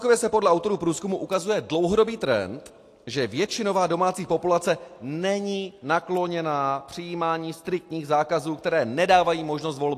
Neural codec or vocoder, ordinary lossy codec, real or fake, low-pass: none; AAC, 64 kbps; real; 14.4 kHz